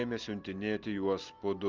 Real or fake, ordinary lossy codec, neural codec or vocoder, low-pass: real; Opus, 32 kbps; none; 7.2 kHz